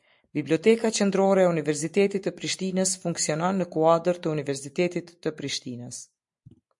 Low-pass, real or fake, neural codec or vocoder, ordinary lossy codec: 10.8 kHz; real; none; MP3, 64 kbps